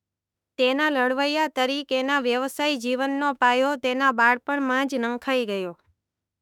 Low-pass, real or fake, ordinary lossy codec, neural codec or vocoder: 19.8 kHz; fake; none; autoencoder, 48 kHz, 32 numbers a frame, DAC-VAE, trained on Japanese speech